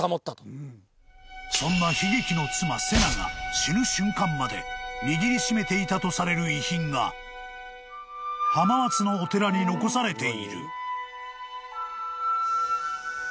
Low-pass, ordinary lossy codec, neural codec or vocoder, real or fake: none; none; none; real